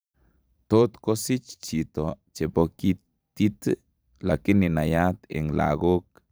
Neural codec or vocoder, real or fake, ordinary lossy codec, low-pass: vocoder, 44.1 kHz, 128 mel bands every 512 samples, BigVGAN v2; fake; none; none